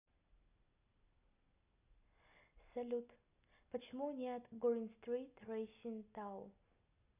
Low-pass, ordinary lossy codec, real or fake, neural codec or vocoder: 3.6 kHz; none; real; none